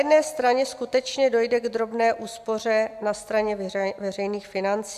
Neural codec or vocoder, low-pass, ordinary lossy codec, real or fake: none; 14.4 kHz; AAC, 96 kbps; real